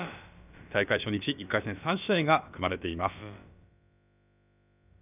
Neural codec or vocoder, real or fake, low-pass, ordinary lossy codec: codec, 16 kHz, about 1 kbps, DyCAST, with the encoder's durations; fake; 3.6 kHz; none